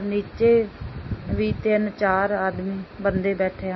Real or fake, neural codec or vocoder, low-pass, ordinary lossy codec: real; none; 7.2 kHz; MP3, 24 kbps